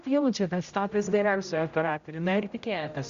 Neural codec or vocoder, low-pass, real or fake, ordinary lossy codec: codec, 16 kHz, 0.5 kbps, X-Codec, HuBERT features, trained on general audio; 7.2 kHz; fake; Opus, 64 kbps